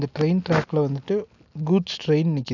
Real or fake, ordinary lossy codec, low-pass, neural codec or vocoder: real; none; 7.2 kHz; none